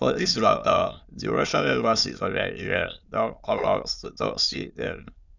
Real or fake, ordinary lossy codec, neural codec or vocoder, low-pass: fake; none; autoencoder, 22.05 kHz, a latent of 192 numbers a frame, VITS, trained on many speakers; 7.2 kHz